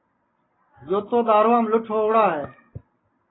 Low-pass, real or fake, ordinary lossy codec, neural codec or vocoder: 7.2 kHz; real; AAC, 16 kbps; none